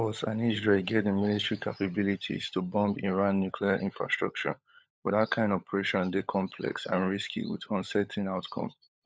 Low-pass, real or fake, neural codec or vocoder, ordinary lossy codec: none; fake; codec, 16 kHz, 16 kbps, FunCodec, trained on LibriTTS, 50 frames a second; none